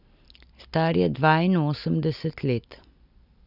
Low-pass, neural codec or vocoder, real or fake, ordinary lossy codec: 5.4 kHz; none; real; none